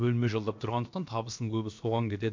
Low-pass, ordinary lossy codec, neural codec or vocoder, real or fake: 7.2 kHz; MP3, 64 kbps; codec, 16 kHz, about 1 kbps, DyCAST, with the encoder's durations; fake